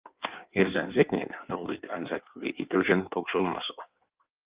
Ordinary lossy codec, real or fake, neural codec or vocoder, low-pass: Opus, 32 kbps; fake; codec, 16 kHz in and 24 kHz out, 1.1 kbps, FireRedTTS-2 codec; 3.6 kHz